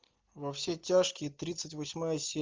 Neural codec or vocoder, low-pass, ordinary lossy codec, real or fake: none; 7.2 kHz; Opus, 32 kbps; real